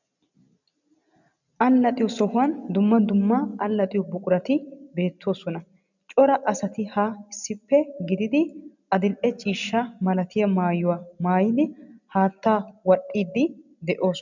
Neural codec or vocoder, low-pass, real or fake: none; 7.2 kHz; real